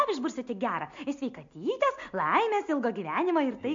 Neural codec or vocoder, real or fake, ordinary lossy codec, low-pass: none; real; MP3, 48 kbps; 7.2 kHz